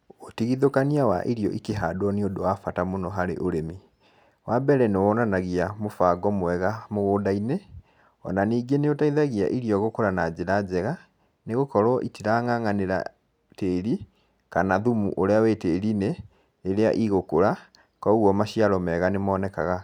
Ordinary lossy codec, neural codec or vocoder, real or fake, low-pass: none; none; real; 19.8 kHz